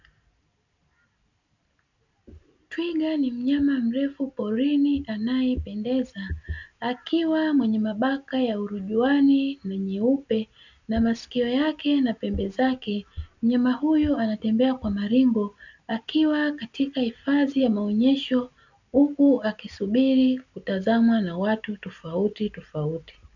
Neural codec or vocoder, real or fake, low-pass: none; real; 7.2 kHz